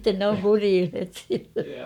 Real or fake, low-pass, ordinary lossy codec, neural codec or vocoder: fake; 19.8 kHz; none; codec, 44.1 kHz, 7.8 kbps, Pupu-Codec